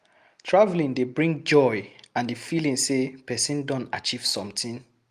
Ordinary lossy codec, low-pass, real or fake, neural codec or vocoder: Opus, 32 kbps; 14.4 kHz; real; none